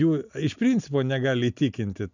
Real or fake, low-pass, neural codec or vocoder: real; 7.2 kHz; none